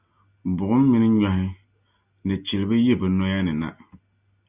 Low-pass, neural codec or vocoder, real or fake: 3.6 kHz; none; real